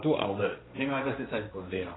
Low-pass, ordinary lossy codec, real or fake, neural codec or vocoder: 7.2 kHz; AAC, 16 kbps; fake; codec, 16 kHz, 1.1 kbps, Voila-Tokenizer